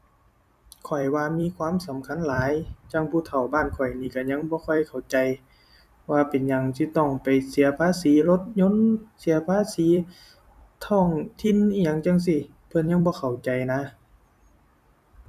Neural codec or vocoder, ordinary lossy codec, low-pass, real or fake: vocoder, 44.1 kHz, 128 mel bands every 256 samples, BigVGAN v2; none; 14.4 kHz; fake